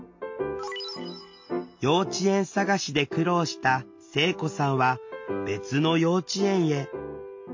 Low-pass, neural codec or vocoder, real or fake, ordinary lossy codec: 7.2 kHz; none; real; MP3, 48 kbps